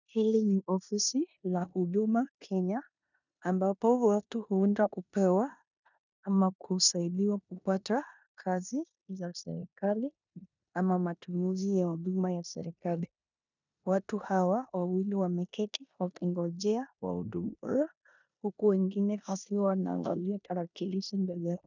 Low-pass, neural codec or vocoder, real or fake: 7.2 kHz; codec, 16 kHz in and 24 kHz out, 0.9 kbps, LongCat-Audio-Codec, four codebook decoder; fake